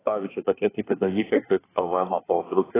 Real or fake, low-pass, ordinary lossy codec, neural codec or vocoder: fake; 3.6 kHz; AAC, 16 kbps; codec, 24 kHz, 1 kbps, SNAC